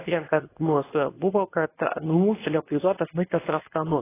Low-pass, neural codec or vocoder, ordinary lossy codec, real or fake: 3.6 kHz; codec, 24 kHz, 0.9 kbps, WavTokenizer, medium speech release version 2; AAC, 16 kbps; fake